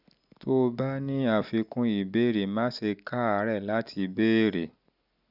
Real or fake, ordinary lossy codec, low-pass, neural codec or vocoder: real; none; 5.4 kHz; none